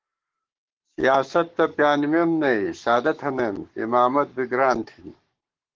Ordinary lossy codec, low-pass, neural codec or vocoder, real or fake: Opus, 16 kbps; 7.2 kHz; codec, 44.1 kHz, 7.8 kbps, Pupu-Codec; fake